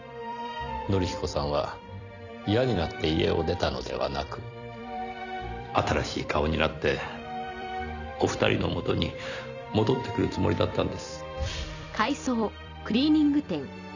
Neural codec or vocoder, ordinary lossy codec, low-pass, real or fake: vocoder, 44.1 kHz, 128 mel bands every 256 samples, BigVGAN v2; none; 7.2 kHz; fake